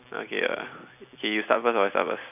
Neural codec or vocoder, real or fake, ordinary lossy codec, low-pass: none; real; none; 3.6 kHz